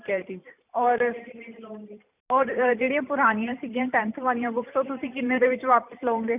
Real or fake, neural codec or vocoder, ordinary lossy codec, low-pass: fake; vocoder, 44.1 kHz, 128 mel bands every 512 samples, BigVGAN v2; none; 3.6 kHz